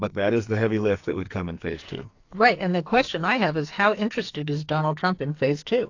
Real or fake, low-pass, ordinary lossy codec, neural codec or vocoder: fake; 7.2 kHz; AAC, 48 kbps; codec, 44.1 kHz, 2.6 kbps, SNAC